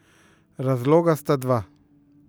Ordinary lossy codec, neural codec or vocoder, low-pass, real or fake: none; none; none; real